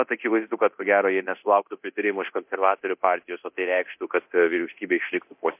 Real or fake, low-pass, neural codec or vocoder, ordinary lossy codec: fake; 3.6 kHz; codec, 24 kHz, 0.9 kbps, DualCodec; MP3, 32 kbps